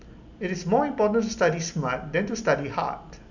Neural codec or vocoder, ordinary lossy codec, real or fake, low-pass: none; none; real; 7.2 kHz